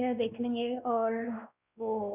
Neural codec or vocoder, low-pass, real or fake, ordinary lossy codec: codec, 16 kHz, 2 kbps, X-Codec, WavLM features, trained on Multilingual LibriSpeech; 3.6 kHz; fake; none